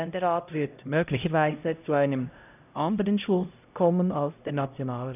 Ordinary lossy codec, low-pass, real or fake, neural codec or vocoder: none; 3.6 kHz; fake; codec, 16 kHz, 0.5 kbps, X-Codec, HuBERT features, trained on LibriSpeech